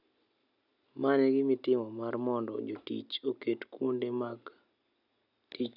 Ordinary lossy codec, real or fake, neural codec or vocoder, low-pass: none; real; none; 5.4 kHz